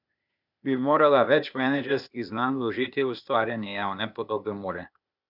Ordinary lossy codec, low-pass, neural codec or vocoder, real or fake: none; 5.4 kHz; codec, 16 kHz, 0.8 kbps, ZipCodec; fake